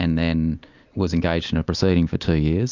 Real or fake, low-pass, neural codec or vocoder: real; 7.2 kHz; none